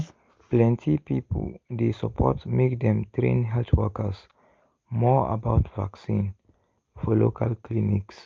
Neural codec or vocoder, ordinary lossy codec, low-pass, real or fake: none; Opus, 32 kbps; 7.2 kHz; real